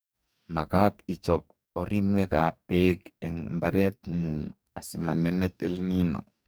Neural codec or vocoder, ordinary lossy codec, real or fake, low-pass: codec, 44.1 kHz, 2.6 kbps, DAC; none; fake; none